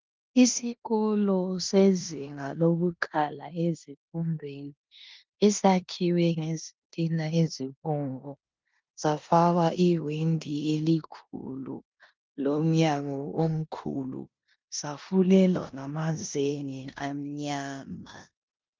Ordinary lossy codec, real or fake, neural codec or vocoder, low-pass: Opus, 32 kbps; fake; codec, 16 kHz in and 24 kHz out, 0.9 kbps, LongCat-Audio-Codec, four codebook decoder; 7.2 kHz